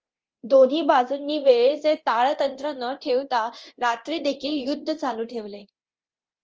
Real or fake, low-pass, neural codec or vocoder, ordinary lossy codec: fake; 7.2 kHz; codec, 24 kHz, 0.9 kbps, DualCodec; Opus, 24 kbps